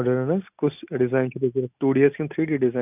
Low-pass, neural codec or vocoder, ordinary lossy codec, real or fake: 3.6 kHz; none; none; real